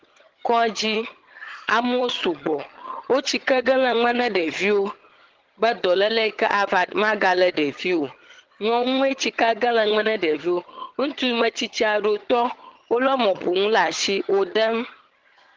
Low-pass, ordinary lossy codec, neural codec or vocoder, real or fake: 7.2 kHz; Opus, 16 kbps; vocoder, 22.05 kHz, 80 mel bands, HiFi-GAN; fake